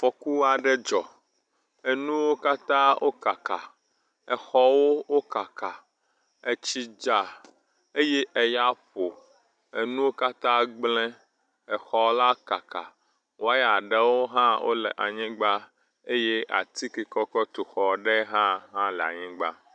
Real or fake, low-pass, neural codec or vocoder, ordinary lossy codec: real; 9.9 kHz; none; MP3, 96 kbps